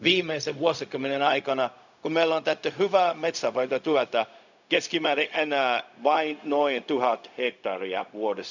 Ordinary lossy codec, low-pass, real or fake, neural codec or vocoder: none; 7.2 kHz; fake; codec, 16 kHz, 0.4 kbps, LongCat-Audio-Codec